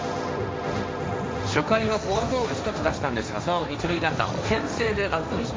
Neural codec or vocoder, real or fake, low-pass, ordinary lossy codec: codec, 16 kHz, 1.1 kbps, Voila-Tokenizer; fake; none; none